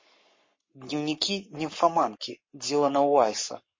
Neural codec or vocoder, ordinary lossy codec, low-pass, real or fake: codec, 44.1 kHz, 7.8 kbps, Pupu-Codec; MP3, 32 kbps; 7.2 kHz; fake